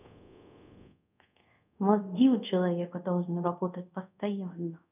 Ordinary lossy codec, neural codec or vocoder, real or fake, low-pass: none; codec, 24 kHz, 0.5 kbps, DualCodec; fake; 3.6 kHz